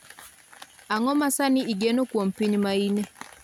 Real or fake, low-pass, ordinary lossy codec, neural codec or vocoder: real; 19.8 kHz; none; none